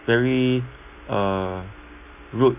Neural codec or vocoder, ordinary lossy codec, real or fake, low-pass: none; none; real; 3.6 kHz